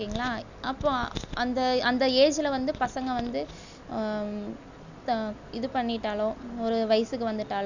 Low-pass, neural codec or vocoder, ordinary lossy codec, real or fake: 7.2 kHz; none; none; real